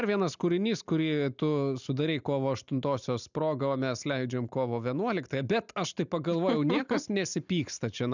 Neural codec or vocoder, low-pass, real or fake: none; 7.2 kHz; real